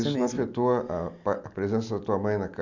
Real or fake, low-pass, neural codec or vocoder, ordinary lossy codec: real; 7.2 kHz; none; none